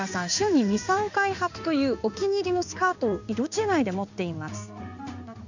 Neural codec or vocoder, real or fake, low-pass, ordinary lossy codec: codec, 16 kHz in and 24 kHz out, 1 kbps, XY-Tokenizer; fake; 7.2 kHz; none